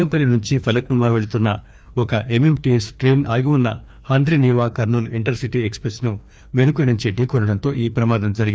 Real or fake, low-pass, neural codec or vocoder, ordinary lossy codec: fake; none; codec, 16 kHz, 2 kbps, FreqCodec, larger model; none